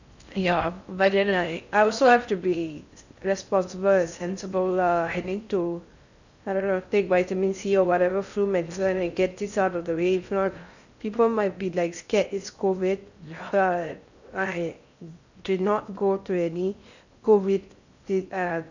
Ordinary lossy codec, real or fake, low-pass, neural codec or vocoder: none; fake; 7.2 kHz; codec, 16 kHz in and 24 kHz out, 0.6 kbps, FocalCodec, streaming, 4096 codes